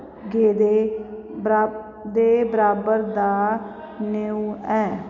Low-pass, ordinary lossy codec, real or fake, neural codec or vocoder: 7.2 kHz; none; real; none